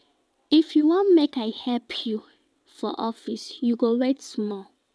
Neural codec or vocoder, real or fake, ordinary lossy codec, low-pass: vocoder, 22.05 kHz, 80 mel bands, WaveNeXt; fake; none; none